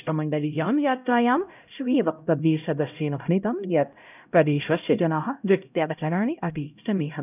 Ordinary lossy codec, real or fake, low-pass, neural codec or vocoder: none; fake; 3.6 kHz; codec, 16 kHz, 0.5 kbps, X-Codec, HuBERT features, trained on LibriSpeech